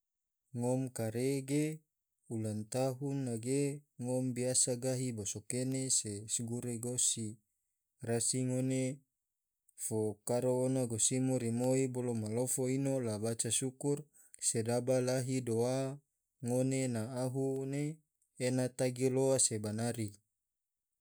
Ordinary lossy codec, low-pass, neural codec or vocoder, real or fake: none; none; none; real